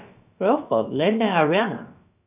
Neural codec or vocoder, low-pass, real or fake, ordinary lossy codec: codec, 16 kHz, about 1 kbps, DyCAST, with the encoder's durations; 3.6 kHz; fake; none